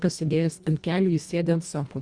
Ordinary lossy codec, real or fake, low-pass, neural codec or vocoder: Opus, 64 kbps; fake; 9.9 kHz; codec, 24 kHz, 1.5 kbps, HILCodec